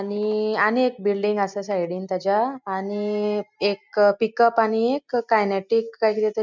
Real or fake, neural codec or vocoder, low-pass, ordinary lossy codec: real; none; 7.2 kHz; MP3, 64 kbps